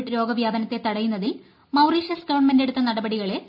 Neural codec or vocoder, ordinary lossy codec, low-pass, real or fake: none; none; 5.4 kHz; real